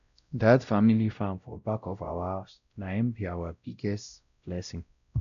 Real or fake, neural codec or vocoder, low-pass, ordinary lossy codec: fake; codec, 16 kHz, 0.5 kbps, X-Codec, WavLM features, trained on Multilingual LibriSpeech; 7.2 kHz; none